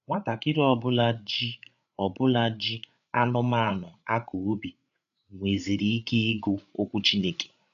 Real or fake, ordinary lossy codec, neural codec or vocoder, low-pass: fake; none; codec, 16 kHz, 8 kbps, FreqCodec, larger model; 7.2 kHz